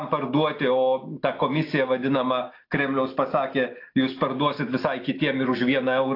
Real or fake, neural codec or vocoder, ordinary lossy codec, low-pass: real; none; AAC, 32 kbps; 5.4 kHz